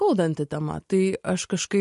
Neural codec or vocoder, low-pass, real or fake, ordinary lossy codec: none; 14.4 kHz; real; MP3, 48 kbps